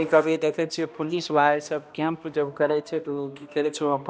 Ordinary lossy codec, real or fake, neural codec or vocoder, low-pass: none; fake; codec, 16 kHz, 1 kbps, X-Codec, HuBERT features, trained on balanced general audio; none